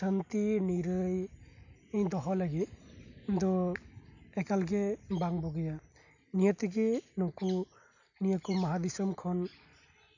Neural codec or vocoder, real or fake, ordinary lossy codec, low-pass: none; real; none; 7.2 kHz